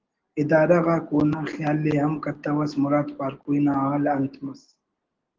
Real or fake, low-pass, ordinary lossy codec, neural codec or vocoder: real; 7.2 kHz; Opus, 16 kbps; none